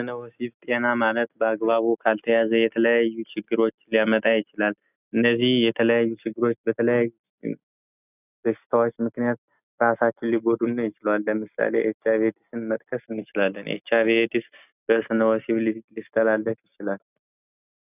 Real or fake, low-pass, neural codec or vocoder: real; 3.6 kHz; none